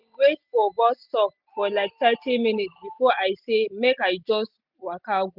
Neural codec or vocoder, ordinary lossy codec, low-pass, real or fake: none; none; 5.4 kHz; real